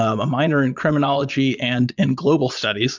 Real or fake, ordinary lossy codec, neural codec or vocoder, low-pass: fake; MP3, 64 kbps; vocoder, 22.05 kHz, 80 mel bands, Vocos; 7.2 kHz